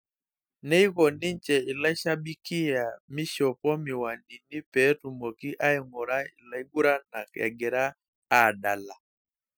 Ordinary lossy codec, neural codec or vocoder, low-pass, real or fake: none; none; none; real